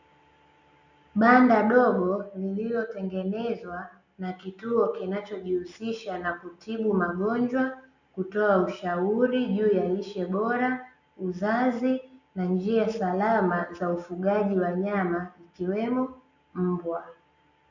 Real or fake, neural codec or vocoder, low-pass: real; none; 7.2 kHz